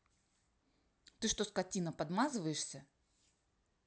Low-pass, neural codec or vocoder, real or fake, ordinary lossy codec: none; none; real; none